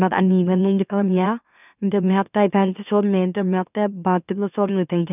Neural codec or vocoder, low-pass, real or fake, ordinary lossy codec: autoencoder, 44.1 kHz, a latent of 192 numbers a frame, MeloTTS; 3.6 kHz; fake; none